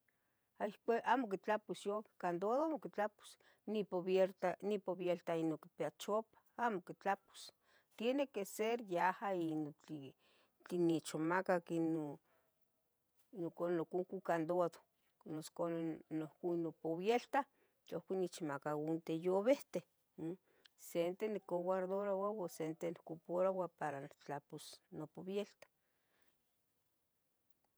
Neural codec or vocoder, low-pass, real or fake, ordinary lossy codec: vocoder, 48 kHz, 128 mel bands, Vocos; none; fake; none